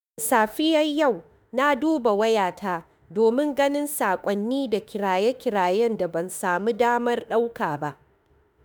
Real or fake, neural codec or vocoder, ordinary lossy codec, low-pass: fake; autoencoder, 48 kHz, 32 numbers a frame, DAC-VAE, trained on Japanese speech; none; none